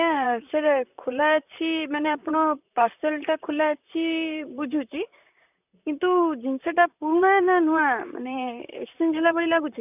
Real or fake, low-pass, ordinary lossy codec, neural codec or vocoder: fake; 3.6 kHz; none; vocoder, 44.1 kHz, 128 mel bands, Pupu-Vocoder